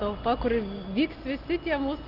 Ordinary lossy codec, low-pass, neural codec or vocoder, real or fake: Opus, 24 kbps; 5.4 kHz; none; real